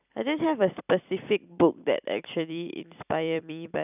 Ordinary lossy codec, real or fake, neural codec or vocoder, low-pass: none; fake; vocoder, 44.1 kHz, 128 mel bands every 256 samples, BigVGAN v2; 3.6 kHz